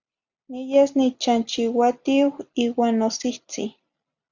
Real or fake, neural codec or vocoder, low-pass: real; none; 7.2 kHz